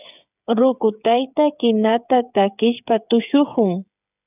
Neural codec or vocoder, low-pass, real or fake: codec, 16 kHz, 8 kbps, FreqCodec, smaller model; 3.6 kHz; fake